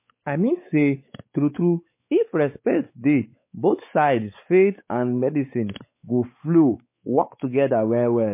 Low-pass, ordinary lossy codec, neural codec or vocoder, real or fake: 3.6 kHz; MP3, 24 kbps; codec, 16 kHz, 4 kbps, X-Codec, WavLM features, trained on Multilingual LibriSpeech; fake